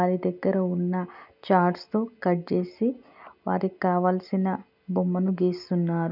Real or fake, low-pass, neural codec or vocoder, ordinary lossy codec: real; 5.4 kHz; none; none